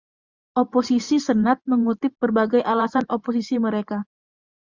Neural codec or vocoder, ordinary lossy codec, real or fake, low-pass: vocoder, 44.1 kHz, 128 mel bands every 512 samples, BigVGAN v2; Opus, 64 kbps; fake; 7.2 kHz